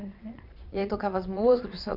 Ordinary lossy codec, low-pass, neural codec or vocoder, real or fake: none; 5.4 kHz; vocoder, 22.05 kHz, 80 mel bands, Vocos; fake